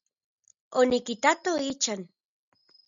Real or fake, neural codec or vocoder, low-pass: real; none; 7.2 kHz